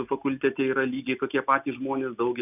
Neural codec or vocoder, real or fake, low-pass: none; real; 3.6 kHz